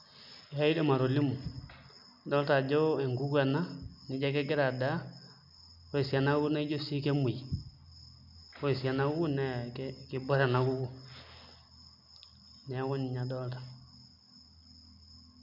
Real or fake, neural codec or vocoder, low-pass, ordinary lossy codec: real; none; 5.4 kHz; none